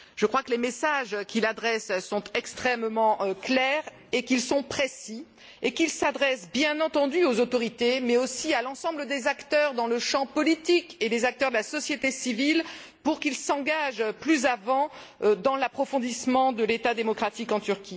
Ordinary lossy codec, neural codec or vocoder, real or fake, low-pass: none; none; real; none